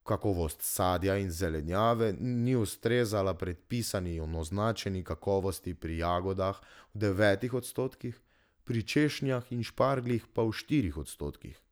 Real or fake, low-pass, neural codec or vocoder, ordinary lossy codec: real; none; none; none